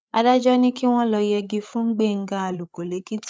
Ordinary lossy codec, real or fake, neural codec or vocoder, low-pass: none; fake; codec, 16 kHz, 8 kbps, FreqCodec, larger model; none